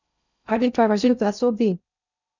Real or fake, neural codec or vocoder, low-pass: fake; codec, 16 kHz in and 24 kHz out, 0.6 kbps, FocalCodec, streaming, 2048 codes; 7.2 kHz